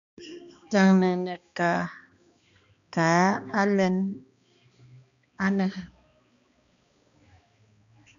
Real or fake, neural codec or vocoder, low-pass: fake; codec, 16 kHz, 2 kbps, X-Codec, HuBERT features, trained on balanced general audio; 7.2 kHz